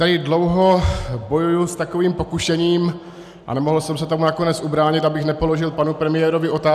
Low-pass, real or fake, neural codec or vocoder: 14.4 kHz; real; none